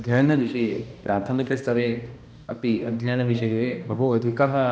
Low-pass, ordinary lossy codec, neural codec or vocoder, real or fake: none; none; codec, 16 kHz, 1 kbps, X-Codec, HuBERT features, trained on balanced general audio; fake